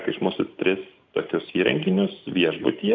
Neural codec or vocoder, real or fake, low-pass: none; real; 7.2 kHz